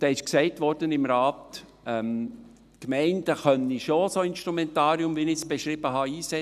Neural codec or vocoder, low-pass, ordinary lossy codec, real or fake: none; 14.4 kHz; none; real